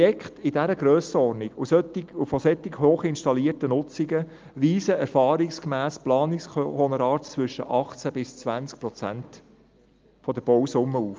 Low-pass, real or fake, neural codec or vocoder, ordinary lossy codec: 7.2 kHz; real; none; Opus, 24 kbps